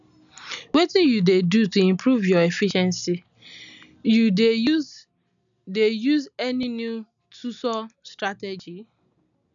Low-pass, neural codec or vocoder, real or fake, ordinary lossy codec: 7.2 kHz; none; real; none